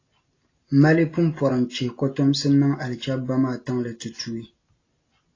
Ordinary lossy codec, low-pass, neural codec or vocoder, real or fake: AAC, 32 kbps; 7.2 kHz; none; real